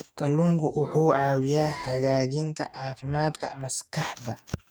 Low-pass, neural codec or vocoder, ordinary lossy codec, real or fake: none; codec, 44.1 kHz, 2.6 kbps, DAC; none; fake